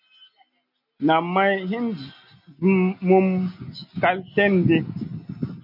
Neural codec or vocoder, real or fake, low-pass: none; real; 5.4 kHz